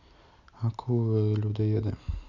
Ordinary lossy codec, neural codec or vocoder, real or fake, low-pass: none; none; real; 7.2 kHz